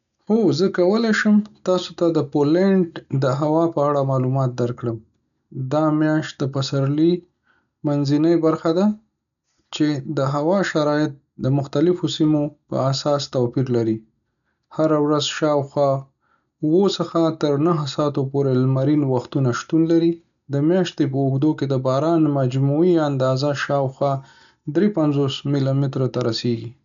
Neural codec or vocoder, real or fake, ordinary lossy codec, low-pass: none; real; none; 7.2 kHz